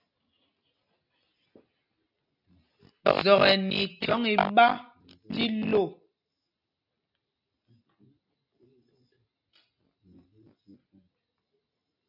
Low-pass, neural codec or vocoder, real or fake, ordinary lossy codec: 5.4 kHz; none; real; AAC, 48 kbps